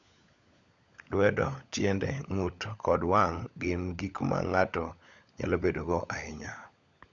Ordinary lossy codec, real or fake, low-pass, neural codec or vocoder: none; fake; 7.2 kHz; codec, 16 kHz, 16 kbps, FunCodec, trained on LibriTTS, 50 frames a second